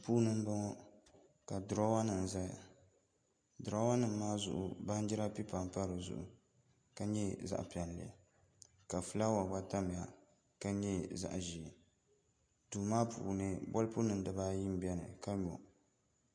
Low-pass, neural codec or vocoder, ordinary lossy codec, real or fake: 9.9 kHz; none; MP3, 48 kbps; real